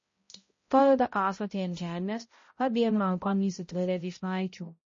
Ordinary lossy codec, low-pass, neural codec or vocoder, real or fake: MP3, 32 kbps; 7.2 kHz; codec, 16 kHz, 0.5 kbps, X-Codec, HuBERT features, trained on balanced general audio; fake